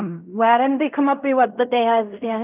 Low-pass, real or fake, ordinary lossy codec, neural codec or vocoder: 3.6 kHz; fake; none; codec, 16 kHz in and 24 kHz out, 0.4 kbps, LongCat-Audio-Codec, fine tuned four codebook decoder